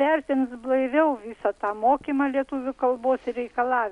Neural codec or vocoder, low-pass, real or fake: none; 10.8 kHz; real